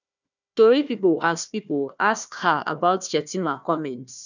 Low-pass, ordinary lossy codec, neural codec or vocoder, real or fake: 7.2 kHz; none; codec, 16 kHz, 1 kbps, FunCodec, trained on Chinese and English, 50 frames a second; fake